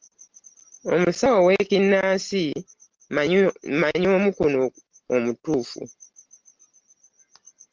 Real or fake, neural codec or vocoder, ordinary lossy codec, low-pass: real; none; Opus, 16 kbps; 7.2 kHz